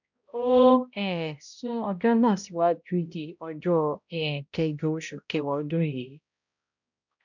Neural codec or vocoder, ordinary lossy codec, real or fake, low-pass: codec, 16 kHz, 0.5 kbps, X-Codec, HuBERT features, trained on balanced general audio; none; fake; 7.2 kHz